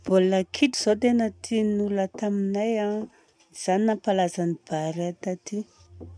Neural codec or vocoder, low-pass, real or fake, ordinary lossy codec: none; 9.9 kHz; real; none